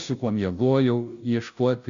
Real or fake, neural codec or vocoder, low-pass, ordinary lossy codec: fake; codec, 16 kHz, 0.5 kbps, FunCodec, trained on Chinese and English, 25 frames a second; 7.2 kHz; MP3, 64 kbps